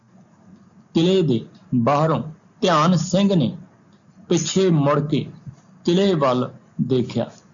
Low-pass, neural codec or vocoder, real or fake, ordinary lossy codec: 7.2 kHz; none; real; MP3, 64 kbps